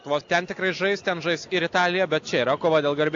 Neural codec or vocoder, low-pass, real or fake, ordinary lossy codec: none; 7.2 kHz; real; AAC, 48 kbps